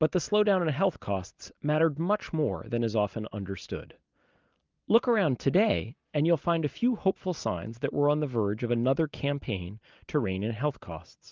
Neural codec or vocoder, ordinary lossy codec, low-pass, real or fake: none; Opus, 24 kbps; 7.2 kHz; real